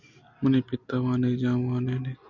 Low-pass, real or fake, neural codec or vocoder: 7.2 kHz; real; none